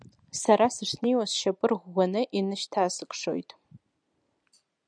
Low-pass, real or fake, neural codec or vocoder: 9.9 kHz; real; none